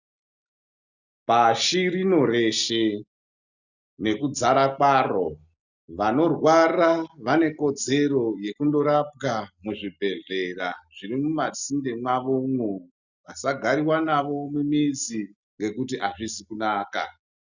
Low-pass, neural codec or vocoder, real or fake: 7.2 kHz; none; real